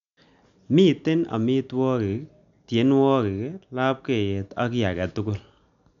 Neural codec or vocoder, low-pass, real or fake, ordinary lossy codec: none; 7.2 kHz; real; none